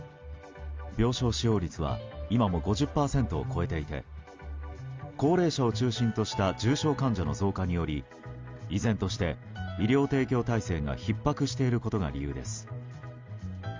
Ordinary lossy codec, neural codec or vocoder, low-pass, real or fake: Opus, 32 kbps; none; 7.2 kHz; real